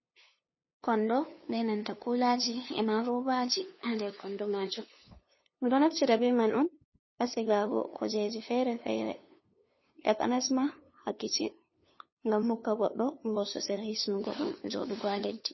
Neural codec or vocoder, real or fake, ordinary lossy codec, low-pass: codec, 16 kHz, 2 kbps, FunCodec, trained on LibriTTS, 25 frames a second; fake; MP3, 24 kbps; 7.2 kHz